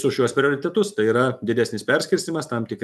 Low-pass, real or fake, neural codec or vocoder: 14.4 kHz; real; none